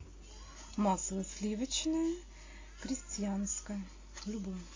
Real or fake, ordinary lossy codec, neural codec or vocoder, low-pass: real; AAC, 32 kbps; none; 7.2 kHz